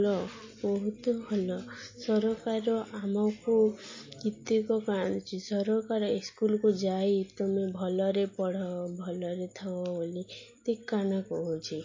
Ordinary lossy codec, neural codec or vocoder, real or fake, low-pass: MP3, 32 kbps; none; real; 7.2 kHz